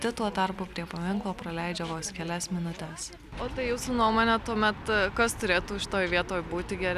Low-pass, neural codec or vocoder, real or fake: 14.4 kHz; none; real